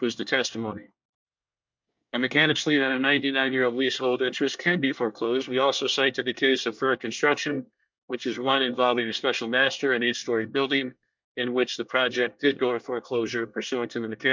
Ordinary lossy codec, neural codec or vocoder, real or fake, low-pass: MP3, 64 kbps; codec, 24 kHz, 1 kbps, SNAC; fake; 7.2 kHz